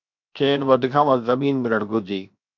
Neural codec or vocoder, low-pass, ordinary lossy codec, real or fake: codec, 16 kHz, 0.7 kbps, FocalCodec; 7.2 kHz; MP3, 64 kbps; fake